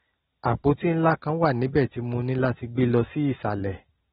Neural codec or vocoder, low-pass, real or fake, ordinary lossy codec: none; 7.2 kHz; real; AAC, 16 kbps